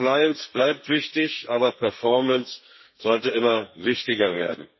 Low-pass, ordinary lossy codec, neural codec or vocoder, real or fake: 7.2 kHz; MP3, 24 kbps; codec, 32 kHz, 1.9 kbps, SNAC; fake